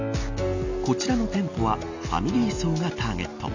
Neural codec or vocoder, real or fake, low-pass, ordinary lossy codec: none; real; 7.2 kHz; none